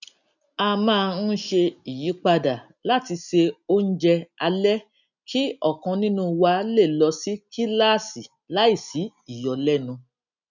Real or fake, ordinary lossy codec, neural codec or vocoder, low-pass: real; none; none; 7.2 kHz